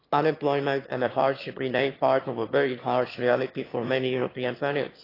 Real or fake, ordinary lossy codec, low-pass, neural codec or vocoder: fake; AAC, 24 kbps; 5.4 kHz; autoencoder, 22.05 kHz, a latent of 192 numbers a frame, VITS, trained on one speaker